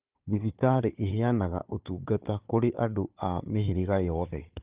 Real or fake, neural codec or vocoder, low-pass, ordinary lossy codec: fake; codec, 16 kHz, 4 kbps, FunCodec, trained on Chinese and English, 50 frames a second; 3.6 kHz; Opus, 32 kbps